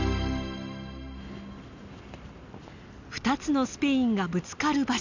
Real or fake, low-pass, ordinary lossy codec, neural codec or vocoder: real; 7.2 kHz; none; none